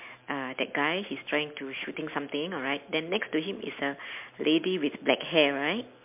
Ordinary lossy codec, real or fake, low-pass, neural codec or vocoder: MP3, 32 kbps; real; 3.6 kHz; none